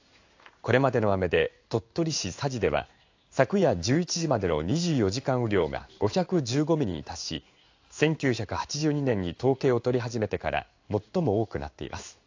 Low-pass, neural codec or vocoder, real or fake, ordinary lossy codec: 7.2 kHz; codec, 16 kHz in and 24 kHz out, 1 kbps, XY-Tokenizer; fake; AAC, 48 kbps